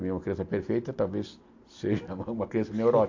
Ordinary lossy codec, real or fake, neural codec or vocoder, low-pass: AAC, 48 kbps; real; none; 7.2 kHz